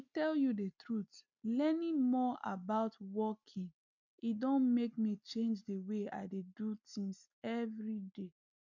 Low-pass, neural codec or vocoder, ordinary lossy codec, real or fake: 7.2 kHz; none; none; real